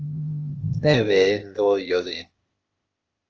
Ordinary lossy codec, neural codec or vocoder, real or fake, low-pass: Opus, 24 kbps; codec, 16 kHz, 0.8 kbps, ZipCodec; fake; 7.2 kHz